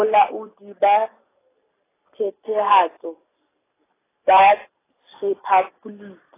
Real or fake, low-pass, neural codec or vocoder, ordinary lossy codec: real; 3.6 kHz; none; AAC, 16 kbps